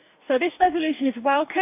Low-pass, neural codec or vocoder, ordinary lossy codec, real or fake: 3.6 kHz; codec, 44.1 kHz, 2.6 kbps, DAC; AAC, 32 kbps; fake